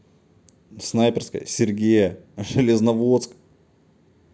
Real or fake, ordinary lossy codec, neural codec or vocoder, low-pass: real; none; none; none